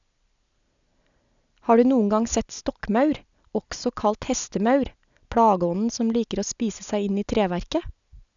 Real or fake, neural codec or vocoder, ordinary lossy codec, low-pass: real; none; none; 7.2 kHz